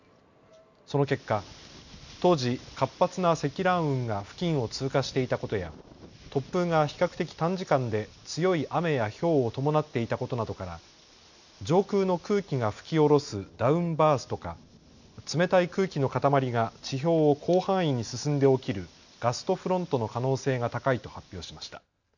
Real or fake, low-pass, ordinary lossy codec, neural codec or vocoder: real; 7.2 kHz; none; none